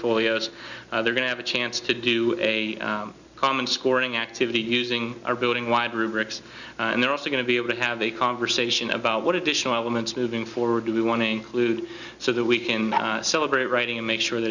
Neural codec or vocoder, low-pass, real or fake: none; 7.2 kHz; real